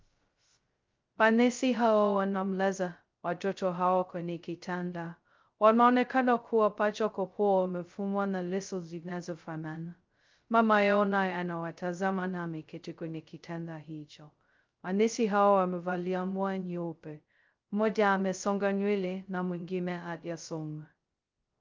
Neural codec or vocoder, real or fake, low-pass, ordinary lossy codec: codec, 16 kHz, 0.2 kbps, FocalCodec; fake; 7.2 kHz; Opus, 24 kbps